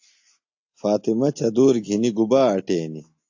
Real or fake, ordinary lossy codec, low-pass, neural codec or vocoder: real; AAC, 48 kbps; 7.2 kHz; none